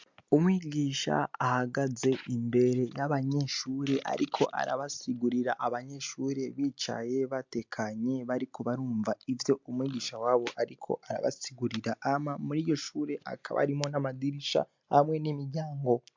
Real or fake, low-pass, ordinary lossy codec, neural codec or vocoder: real; 7.2 kHz; MP3, 64 kbps; none